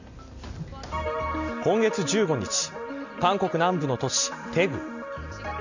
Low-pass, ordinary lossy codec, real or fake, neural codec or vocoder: 7.2 kHz; none; real; none